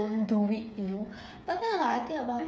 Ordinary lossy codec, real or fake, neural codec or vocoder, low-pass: none; fake; codec, 16 kHz, 8 kbps, FreqCodec, smaller model; none